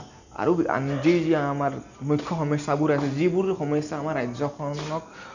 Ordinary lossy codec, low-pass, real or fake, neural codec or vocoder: none; 7.2 kHz; real; none